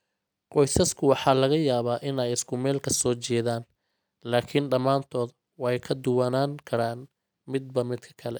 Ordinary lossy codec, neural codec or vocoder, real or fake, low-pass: none; none; real; none